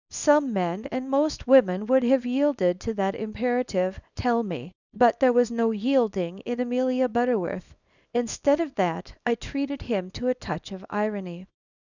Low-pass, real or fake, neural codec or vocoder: 7.2 kHz; fake; codec, 24 kHz, 0.9 kbps, WavTokenizer, small release